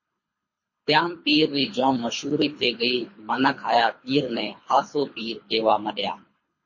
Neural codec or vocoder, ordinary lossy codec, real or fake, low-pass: codec, 24 kHz, 3 kbps, HILCodec; MP3, 32 kbps; fake; 7.2 kHz